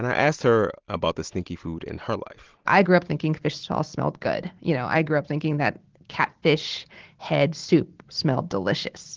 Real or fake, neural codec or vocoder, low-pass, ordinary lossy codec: real; none; 7.2 kHz; Opus, 16 kbps